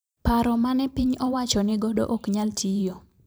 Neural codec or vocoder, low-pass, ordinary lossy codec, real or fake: vocoder, 44.1 kHz, 128 mel bands every 512 samples, BigVGAN v2; none; none; fake